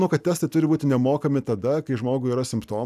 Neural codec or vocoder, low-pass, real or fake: none; 14.4 kHz; real